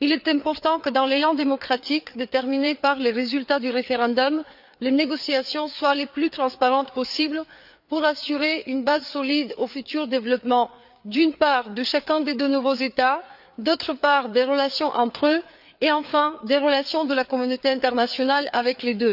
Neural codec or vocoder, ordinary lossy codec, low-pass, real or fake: codec, 16 kHz, 4 kbps, FreqCodec, larger model; none; 5.4 kHz; fake